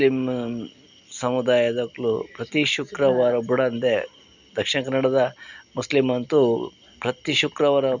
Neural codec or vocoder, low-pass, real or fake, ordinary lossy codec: none; 7.2 kHz; real; none